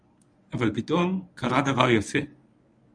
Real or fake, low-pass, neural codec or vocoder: fake; 9.9 kHz; codec, 24 kHz, 0.9 kbps, WavTokenizer, medium speech release version 1